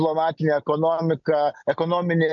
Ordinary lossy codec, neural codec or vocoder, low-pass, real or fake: MP3, 96 kbps; none; 7.2 kHz; real